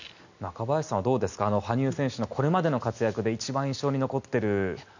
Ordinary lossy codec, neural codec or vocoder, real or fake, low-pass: none; none; real; 7.2 kHz